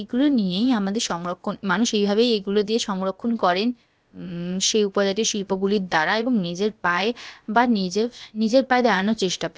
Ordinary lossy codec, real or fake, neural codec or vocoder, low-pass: none; fake; codec, 16 kHz, about 1 kbps, DyCAST, with the encoder's durations; none